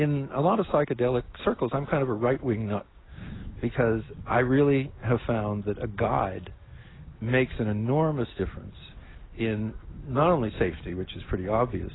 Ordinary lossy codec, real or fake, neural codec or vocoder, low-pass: AAC, 16 kbps; real; none; 7.2 kHz